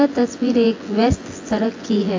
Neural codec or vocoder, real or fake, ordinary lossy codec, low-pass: vocoder, 24 kHz, 100 mel bands, Vocos; fake; AAC, 32 kbps; 7.2 kHz